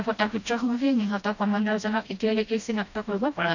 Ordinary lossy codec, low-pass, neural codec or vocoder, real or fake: none; 7.2 kHz; codec, 16 kHz, 1 kbps, FreqCodec, smaller model; fake